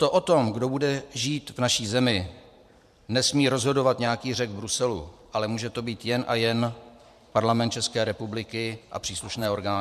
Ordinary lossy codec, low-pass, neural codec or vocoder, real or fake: MP3, 96 kbps; 14.4 kHz; vocoder, 44.1 kHz, 128 mel bands every 512 samples, BigVGAN v2; fake